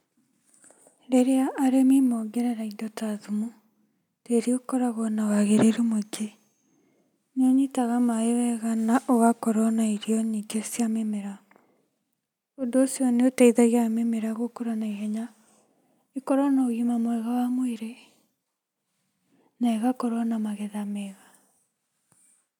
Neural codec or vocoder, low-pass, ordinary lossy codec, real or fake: none; 19.8 kHz; none; real